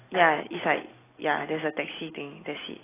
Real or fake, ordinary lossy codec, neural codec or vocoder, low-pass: real; AAC, 16 kbps; none; 3.6 kHz